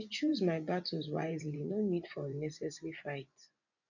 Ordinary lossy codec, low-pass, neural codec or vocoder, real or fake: none; 7.2 kHz; vocoder, 24 kHz, 100 mel bands, Vocos; fake